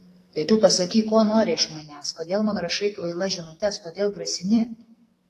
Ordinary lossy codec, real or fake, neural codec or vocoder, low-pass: AAC, 48 kbps; fake; codec, 44.1 kHz, 2.6 kbps, SNAC; 14.4 kHz